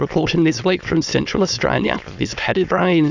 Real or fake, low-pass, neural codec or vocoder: fake; 7.2 kHz; autoencoder, 22.05 kHz, a latent of 192 numbers a frame, VITS, trained on many speakers